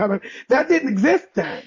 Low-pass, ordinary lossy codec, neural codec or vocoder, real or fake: 7.2 kHz; MP3, 32 kbps; none; real